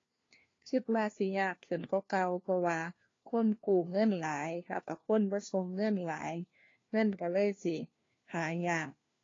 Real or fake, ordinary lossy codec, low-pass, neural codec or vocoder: fake; AAC, 32 kbps; 7.2 kHz; codec, 16 kHz, 1 kbps, FunCodec, trained on LibriTTS, 50 frames a second